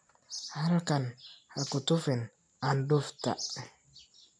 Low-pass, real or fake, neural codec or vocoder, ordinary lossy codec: 9.9 kHz; real; none; none